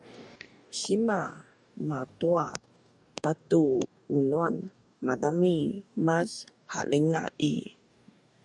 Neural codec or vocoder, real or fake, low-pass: codec, 44.1 kHz, 2.6 kbps, DAC; fake; 10.8 kHz